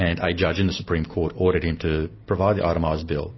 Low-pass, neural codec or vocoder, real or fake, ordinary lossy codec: 7.2 kHz; none; real; MP3, 24 kbps